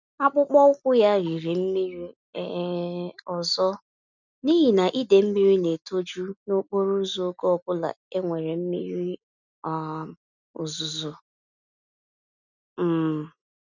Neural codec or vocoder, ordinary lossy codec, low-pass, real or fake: none; none; 7.2 kHz; real